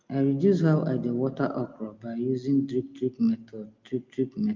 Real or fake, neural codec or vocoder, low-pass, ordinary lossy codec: real; none; 7.2 kHz; Opus, 24 kbps